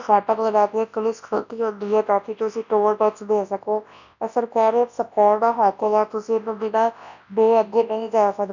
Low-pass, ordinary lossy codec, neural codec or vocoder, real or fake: 7.2 kHz; none; codec, 24 kHz, 0.9 kbps, WavTokenizer, large speech release; fake